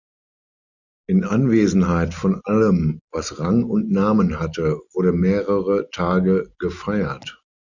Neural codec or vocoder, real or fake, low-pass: none; real; 7.2 kHz